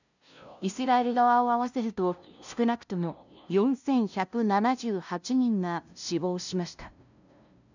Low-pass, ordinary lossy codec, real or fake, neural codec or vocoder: 7.2 kHz; none; fake; codec, 16 kHz, 0.5 kbps, FunCodec, trained on LibriTTS, 25 frames a second